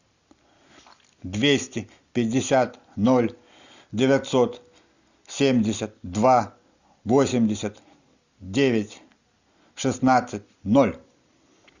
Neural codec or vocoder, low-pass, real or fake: none; 7.2 kHz; real